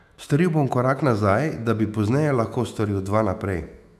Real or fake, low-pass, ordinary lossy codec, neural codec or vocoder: fake; 14.4 kHz; none; autoencoder, 48 kHz, 128 numbers a frame, DAC-VAE, trained on Japanese speech